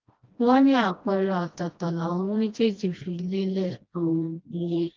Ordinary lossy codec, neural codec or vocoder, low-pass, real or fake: Opus, 32 kbps; codec, 16 kHz, 1 kbps, FreqCodec, smaller model; 7.2 kHz; fake